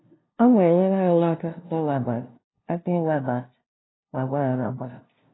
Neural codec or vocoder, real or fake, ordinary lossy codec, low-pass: codec, 16 kHz, 0.5 kbps, FunCodec, trained on LibriTTS, 25 frames a second; fake; AAC, 16 kbps; 7.2 kHz